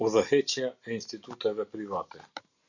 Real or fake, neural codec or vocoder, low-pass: real; none; 7.2 kHz